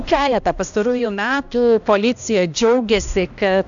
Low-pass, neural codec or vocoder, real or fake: 7.2 kHz; codec, 16 kHz, 1 kbps, X-Codec, HuBERT features, trained on balanced general audio; fake